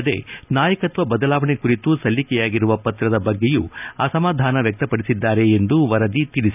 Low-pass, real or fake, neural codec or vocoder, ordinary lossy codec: 3.6 kHz; real; none; none